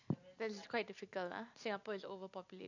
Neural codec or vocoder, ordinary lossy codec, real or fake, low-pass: none; none; real; 7.2 kHz